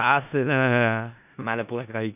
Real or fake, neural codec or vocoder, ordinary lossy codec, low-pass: fake; codec, 16 kHz in and 24 kHz out, 0.4 kbps, LongCat-Audio-Codec, four codebook decoder; none; 3.6 kHz